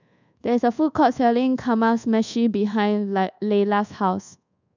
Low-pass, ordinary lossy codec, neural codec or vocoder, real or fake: 7.2 kHz; none; codec, 24 kHz, 1.2 kbps, DualCodec; fake